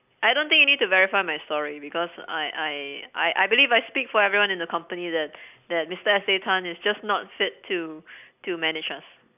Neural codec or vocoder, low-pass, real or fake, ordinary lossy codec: none; 3.6 kHz; real; none